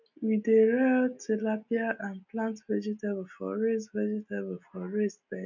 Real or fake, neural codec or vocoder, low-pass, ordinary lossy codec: real; none; 7.2 kHz; none